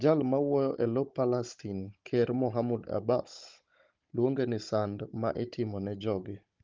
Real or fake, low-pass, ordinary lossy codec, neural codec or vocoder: fake; 7.2 kHz; Opus, 32 kbps; codec, 16 kHz, 16 kbps, FunCodec, trained on Chinese and English, 50 frames a second